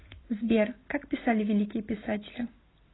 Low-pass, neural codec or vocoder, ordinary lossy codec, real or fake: 7.2 kHz; none; AAC, 16 kbps; real